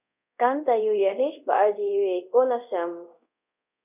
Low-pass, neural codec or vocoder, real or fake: 3.6 kHz; codec, 24 kHz, 0.5 kbps, DualCodec; fake